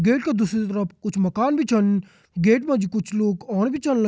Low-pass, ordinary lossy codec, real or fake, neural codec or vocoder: none; none; real; none